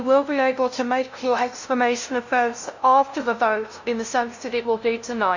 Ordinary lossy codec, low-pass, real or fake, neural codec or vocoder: none; 7.2 kHz; fake; codec, 16 kHz, 0.5 kbps, FunCodec, trained on LibriTTS, 25 frames a second